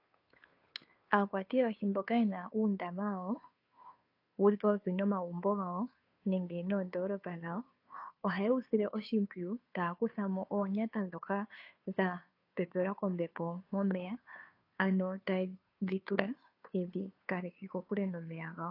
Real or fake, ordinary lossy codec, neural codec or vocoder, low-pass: fake; AAC, 32 kbps; codec, 16 kHz, 2 kbps, FunCodec, trained on Chinese and English, 25 frames a second; 5.4 kHz